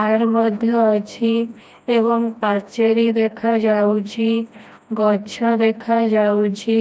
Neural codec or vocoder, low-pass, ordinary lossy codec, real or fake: codec, 16 kHz, 1 kbps, FreqCodec, smaller model; none; none; fake